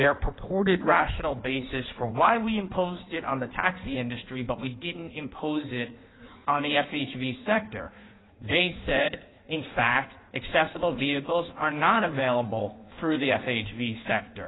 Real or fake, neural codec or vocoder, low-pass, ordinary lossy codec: fake; codec, 16 kHz in and 24 kHz out, 1.1 kbps, FireRedTTS-2 codec; 7.2 kHz; AAC, 16 kbps